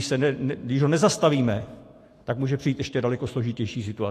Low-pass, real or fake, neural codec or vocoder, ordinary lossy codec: 14.4 kHz; fake; autoencoder, 48 kHz, 128 numbers a frame, DAC-VAE, trained on Japanese speech; AAC, 48 kbps